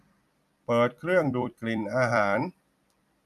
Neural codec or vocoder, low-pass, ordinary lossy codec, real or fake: vocoder, 44.1 kHz, 128 mel bands every 256 samples, BigVGAN v2; 14.4 kHz; none; fake